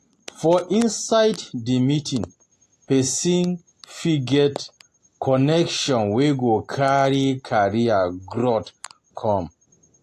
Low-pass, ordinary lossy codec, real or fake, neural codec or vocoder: 14.4 kHz; AAC, 48 kbps; real; none